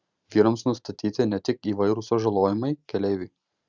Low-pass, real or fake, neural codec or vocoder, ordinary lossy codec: 7.2 kHz; fake; vocoder, 44.1 kHz, 128 mel bands every 512 samples, BigVGAN v2; Opus, 64 kbps